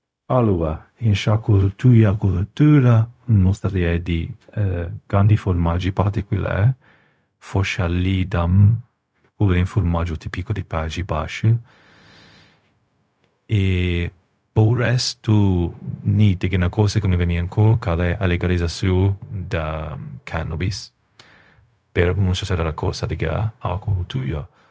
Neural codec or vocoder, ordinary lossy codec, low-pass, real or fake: codec, 16 kHz, 0.4 kbps, LongCat-Audio-Codec; none; none; fake